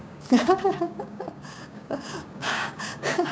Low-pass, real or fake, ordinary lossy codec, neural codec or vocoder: none; fake; none; codec, 16 kHz, 6 kbps, DAC